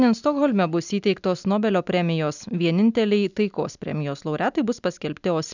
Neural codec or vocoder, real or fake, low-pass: none; real; 7.2 kHz